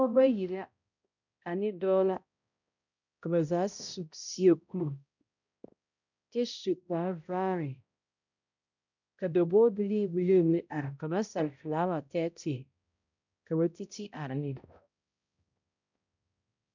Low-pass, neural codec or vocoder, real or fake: 7.2 kHz; codec, 16 kHz, 0.5 kbps, X-Codec, HuBERT features, trained on balanced general audio; fake